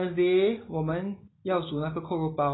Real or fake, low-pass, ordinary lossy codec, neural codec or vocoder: real; 7.2 kHz; AAC, 16 kbps; none